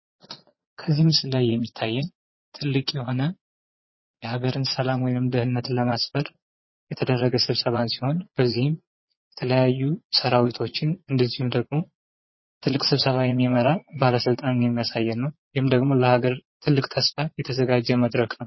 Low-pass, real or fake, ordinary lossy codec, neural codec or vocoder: 7.2 kHz; fake; MP3, 24 kbps; vocoder, 22.05 kHz, 80 mel bands, Vocos